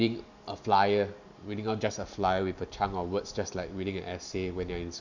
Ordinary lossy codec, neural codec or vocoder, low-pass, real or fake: none; none; 7.2 kHz; real